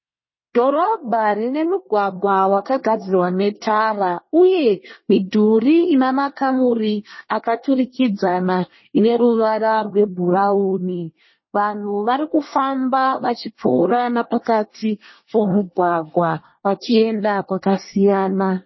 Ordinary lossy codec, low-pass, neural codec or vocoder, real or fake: MP3, 24 kbps; 7.2 kHz; codec, 24 kHz, 1 kbps, SNAC; fake